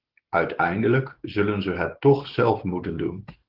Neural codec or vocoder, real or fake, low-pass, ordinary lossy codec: none; real; 5.4 kHz; Opus, 16 kbps